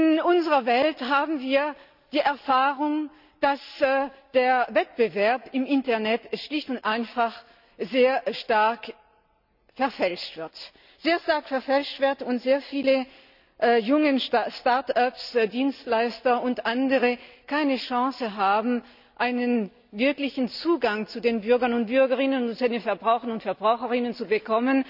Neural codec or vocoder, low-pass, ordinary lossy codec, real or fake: none; 5.4 kHz; none; real